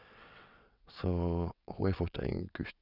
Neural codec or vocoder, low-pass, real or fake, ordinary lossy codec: vocoder, 22.05 kHz, 80 mel bands, WaveNeXt; 5.4 kHz; fake; none